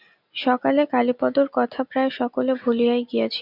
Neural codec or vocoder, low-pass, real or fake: none; 5.4 kHz; real